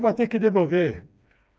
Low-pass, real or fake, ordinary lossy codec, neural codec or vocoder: none; fake; none; codec, 16 kHz, 2 kbps, FreqCodec, smaller model